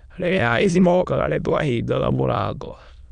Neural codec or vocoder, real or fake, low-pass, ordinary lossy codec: autoencoder, 22.05 kHz, a latent of 192 numbers a frame, VITS, trained on many speakers; fake; 9.9 kHz; none